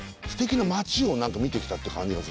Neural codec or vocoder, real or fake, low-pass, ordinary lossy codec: none; real; none; none